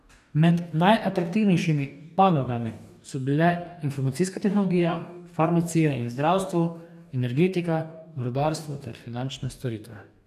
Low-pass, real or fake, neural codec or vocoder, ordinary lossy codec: 14.4 kHz; fake; codec, 44.1 kHz, 2.6 kbps, DAC; none